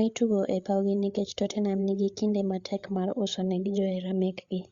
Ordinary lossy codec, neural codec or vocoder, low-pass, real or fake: Opus, 64 kbps; codec, 16 kHz, 8 kbps, FreqCodec, larger model; 7.2 kHz; fake